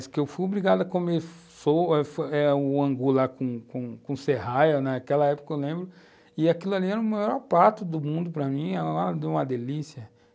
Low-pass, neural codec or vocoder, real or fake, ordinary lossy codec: none; none; real; none